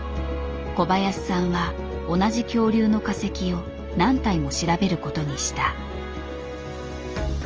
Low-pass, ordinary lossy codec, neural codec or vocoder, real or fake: 7.2 kHz; Opus, 24 kbps; none; real